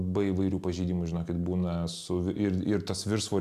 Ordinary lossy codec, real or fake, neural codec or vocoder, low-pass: AAC, 96 kbps; real; none; 14.4 kHz